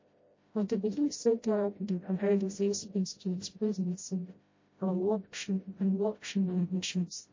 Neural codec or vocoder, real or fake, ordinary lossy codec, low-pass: codec, 16 kHz, 0.5 kbps, FreqCodec, smaller model; fake; MP3, 32 kbps; 7.2 kHz